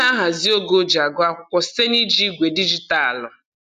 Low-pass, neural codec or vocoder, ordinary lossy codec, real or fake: 14.4 kHz; none; none; real